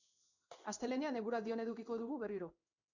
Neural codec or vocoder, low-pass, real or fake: codec, 16 kHz in and 24 kHz out, 1 kbps, XY-Tokenizer; 7.2 kHz; fake